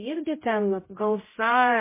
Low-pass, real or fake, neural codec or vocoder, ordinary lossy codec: 3.6 kHz; fake; codec, 16 kHz, 0.5 kbps, X-Codec, HuBERT features, trained on general audio; MP3, 16 kbps